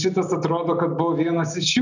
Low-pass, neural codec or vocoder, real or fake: 7.2 kHz; none; real